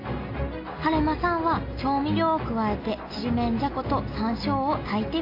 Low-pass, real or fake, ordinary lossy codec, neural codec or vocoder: 5.4 kHz; real; AAC, 32 kbps; none